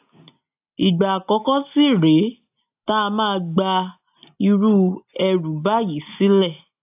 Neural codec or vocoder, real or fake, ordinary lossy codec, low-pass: none; real; AAC, 32 kbps; 3.6 kHz